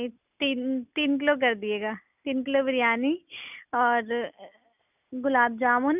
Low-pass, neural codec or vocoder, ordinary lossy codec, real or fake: 3.6 kHz; none; none; real